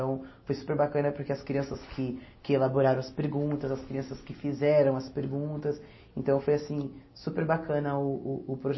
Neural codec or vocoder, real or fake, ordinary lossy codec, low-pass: none; real; MP3, 24 kbps; 7.2 kHz